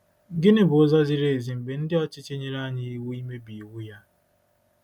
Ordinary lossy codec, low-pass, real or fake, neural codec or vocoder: none; 19.8 kHz; real; none